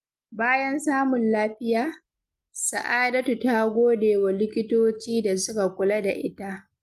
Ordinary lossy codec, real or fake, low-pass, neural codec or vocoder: Opus, 32 kbps; real; 14.4 kHz; none